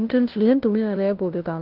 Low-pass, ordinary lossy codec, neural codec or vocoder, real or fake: 5.4 kHz; Opus, 16 kbps; codec, 16 kHz, 0.5 kbps, FunCodec, trained on LibriTTS, 25 frames a second; fake